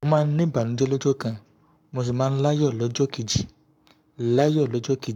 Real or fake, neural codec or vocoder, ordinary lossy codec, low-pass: fake; codec, 44.1 kHz, 7.8 kbps, Pupu-Codec; none; 19.8 kHz